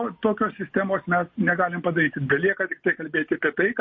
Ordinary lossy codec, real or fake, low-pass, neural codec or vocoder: MP3, 32 kbps; real; 7.2 kHz; none